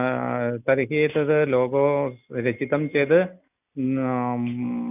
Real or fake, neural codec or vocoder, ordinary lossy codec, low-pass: real; none; none; 3.6 kHz